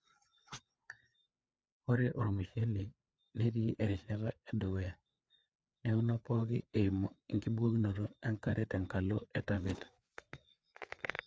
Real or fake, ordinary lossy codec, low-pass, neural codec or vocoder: fake; none; none; codec, 16 kHz, 4 kbps, FreqCodec, larger model